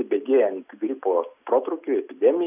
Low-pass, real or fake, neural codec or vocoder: 3.6 kHz; real; none